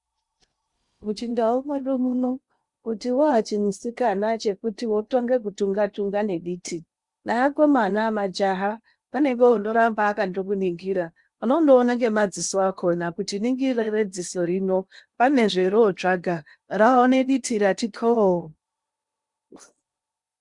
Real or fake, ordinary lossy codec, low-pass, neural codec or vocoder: fake; Opus, 64 kbps; 10.8 kHz; codec, 16 kHz in and 24 kHz out, 0.8 kbps, FocalCodec, streaming, 65536 codes